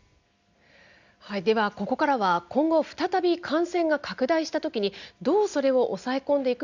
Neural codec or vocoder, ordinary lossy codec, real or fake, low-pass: none; Opus, 64 kbps; real; 7.2 kHz